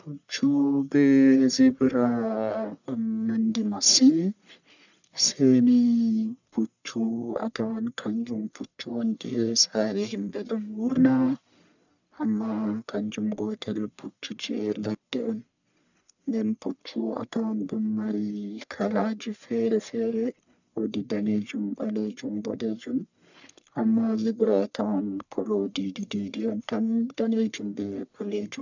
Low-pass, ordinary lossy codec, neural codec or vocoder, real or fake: 7.2 kHz; none; codec, 44.1 kHz, 1.7 kbps, Pupu-Codec; fake